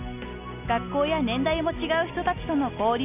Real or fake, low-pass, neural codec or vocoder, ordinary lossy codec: real; 3.6 kHz; none; none